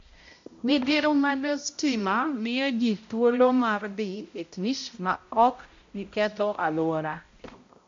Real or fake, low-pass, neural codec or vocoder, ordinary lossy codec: fake; 7.2 kHz; codec, 16 kHz, 0.5 kbps, X-Codec, HuBERT features, trained on balanced general audio; MP3, 48 kbps